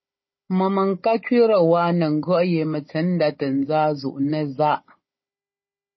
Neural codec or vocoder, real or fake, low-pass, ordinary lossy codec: codec, 16 kHz, 16 kbps, FunCodec, trained on Chinese and English, 50 frames a second; fake; 7.2 kHz; MP3, 24 kbps